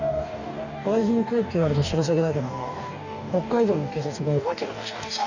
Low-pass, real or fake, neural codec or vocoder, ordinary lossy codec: 7.2 kHz; fake; codec, 44.1 kHz, 2.6 kbps, DAC; none